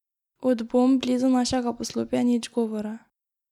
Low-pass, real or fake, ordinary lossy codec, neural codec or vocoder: 19.8 kHz; real; none; none